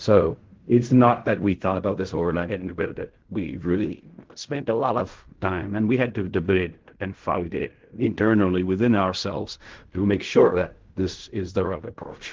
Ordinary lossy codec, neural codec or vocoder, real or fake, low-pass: Opus, 16 kbps; codec, 16 kHz in and 24 kHz out, 0.4 kbps, LongCat-Audio-Codec, fine tuned four codebook decoder; fake; 7.2 kHz